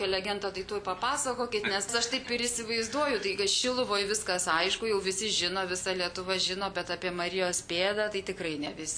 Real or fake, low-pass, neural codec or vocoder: real; 9.9 kHz; none